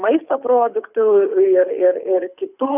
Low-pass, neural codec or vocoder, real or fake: 3.6 kHz; codec, 24 kHz, 6 kbps, HILCodec; fake